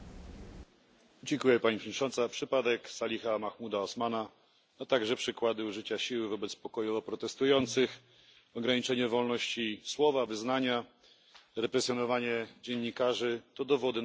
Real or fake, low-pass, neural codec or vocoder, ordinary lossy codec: real; none; none; none